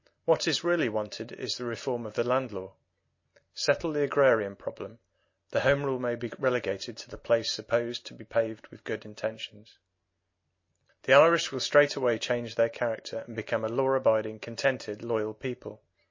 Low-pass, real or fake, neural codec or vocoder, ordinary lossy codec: 7.2 kHz; real; none; MP3, 32 kbps